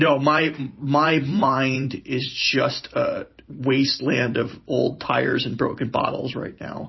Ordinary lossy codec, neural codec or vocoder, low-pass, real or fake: MP3, 24 kbps; vocoder, 44.1 kHz, 128 mel bands every 512 samples, BigVGAN v2; 7.2 kHz; fake